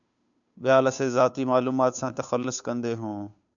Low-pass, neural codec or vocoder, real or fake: 7.2 kHz; codec, 16 kHz, 2 kbps, FunCodec, trained on Chinese and English, 25 frames a second; fake